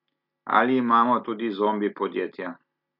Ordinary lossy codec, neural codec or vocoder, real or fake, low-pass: MP3, 48 kbps; none; real; 5.4 kHz